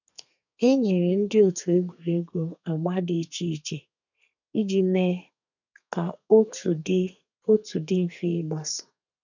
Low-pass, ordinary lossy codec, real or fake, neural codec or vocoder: 7.2 kHz; none; fake; codec, 32 kHz, 1.9 kbps, SNAC